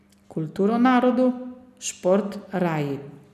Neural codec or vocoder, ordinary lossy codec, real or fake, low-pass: none; none; real; 14.4 kHz